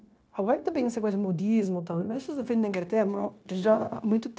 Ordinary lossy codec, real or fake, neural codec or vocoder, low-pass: none; fake; codec, 16 kHz, 0.9 kbps, LongCat-Audio-Codec; none